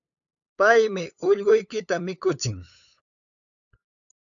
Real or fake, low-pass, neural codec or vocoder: fake; 7.2 kHz; codec, 16 kHz, 8 kbps, FunCodec, trained on LibriTTS, 25 frames a second